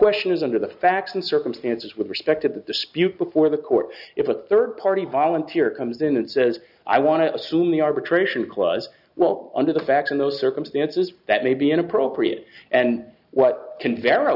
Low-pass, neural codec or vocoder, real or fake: 5.4 kHz; none; real